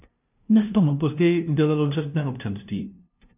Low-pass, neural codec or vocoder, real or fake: 3.6 kHz; codec, 16 kHz, 0.5 kbps, FunCodec, trained on LibriTTS, 25 frames a second; fake